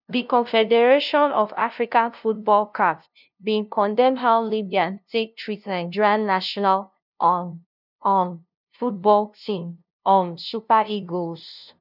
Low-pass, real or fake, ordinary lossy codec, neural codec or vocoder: 5.4 kHz; fake; none; codec, 16 kHz, 0.5 kbps, FunCodec, trained on LibriTTS, 25 frames a second